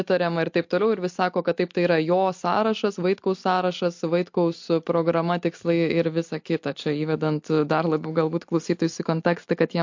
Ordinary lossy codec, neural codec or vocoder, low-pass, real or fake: MP3, 48 kbps; none; 7.2 kHz; real